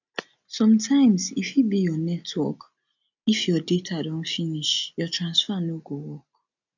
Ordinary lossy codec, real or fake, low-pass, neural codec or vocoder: none; real; 7.2 kHz; none